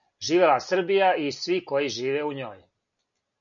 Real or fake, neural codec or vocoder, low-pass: real; none; 7.2 kHz